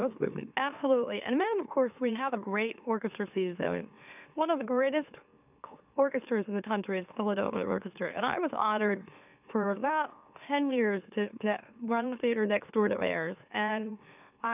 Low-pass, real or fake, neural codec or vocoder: 3.6 kHz; fake; autoencoder, 44.1 kHz, a latent of 192 numbers a frame, MeloTTS